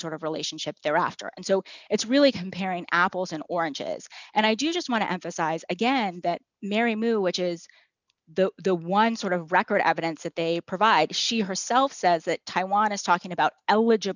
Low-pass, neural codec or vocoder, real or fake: 7.2 kHz; none; real